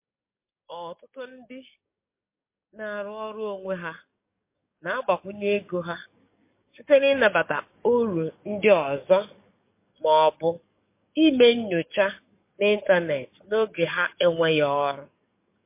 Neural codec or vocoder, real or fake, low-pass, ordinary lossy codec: codec, 44.1 kHz, 7.8 kbps, DAC; fake; 3.6 kHz; MP3, 24 kbps